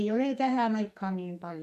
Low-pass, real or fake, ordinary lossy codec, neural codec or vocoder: 14.4 kHz; fake; none; codec, 44.1 kHz, 2.6 kbps, SNAC